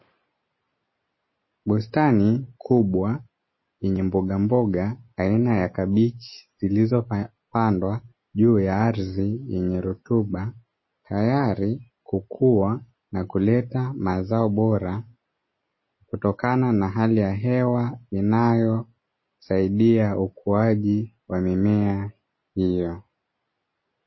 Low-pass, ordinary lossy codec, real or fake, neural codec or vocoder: 7.2 kHz; MP3, 24 kbps; real; none